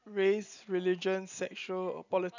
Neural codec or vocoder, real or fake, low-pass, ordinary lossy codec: none; real; 7.2 kHz; none